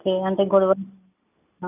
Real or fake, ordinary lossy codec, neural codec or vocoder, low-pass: real; none; none; 3.6 kHz